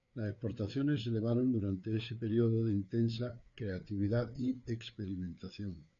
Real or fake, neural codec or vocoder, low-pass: fake; codec, 16 kHz, 4 kbps, FreqCodec, larger model; 7.2 kHz